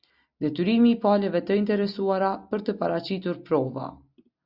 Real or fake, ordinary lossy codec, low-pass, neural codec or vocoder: real; Opus, 64 kbps; 5.4 kHz; none